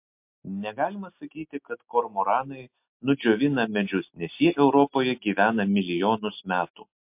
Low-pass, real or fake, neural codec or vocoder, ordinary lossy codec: 3.6 kHz; real; none; MP3, 32 kbps